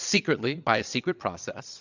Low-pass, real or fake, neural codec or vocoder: 7.2 kHz; fake; vocoder, 22.05 kHz, 80 mel bands, Vocos